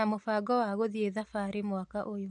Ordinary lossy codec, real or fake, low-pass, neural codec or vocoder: MP3, 64 kbps; real; 9.9 kHz; none